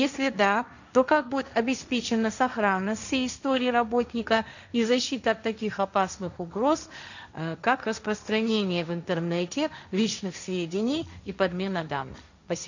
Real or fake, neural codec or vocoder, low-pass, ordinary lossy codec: fake; codec, 16 kHz, 1.1 kbps, Voila-Tokenizer; 7.2 kHz; none